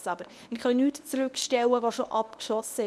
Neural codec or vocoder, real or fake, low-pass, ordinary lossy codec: codec, 24 kHz, 0.9 kbps, WavTokenizer, medium speech release version 1; fake; none; none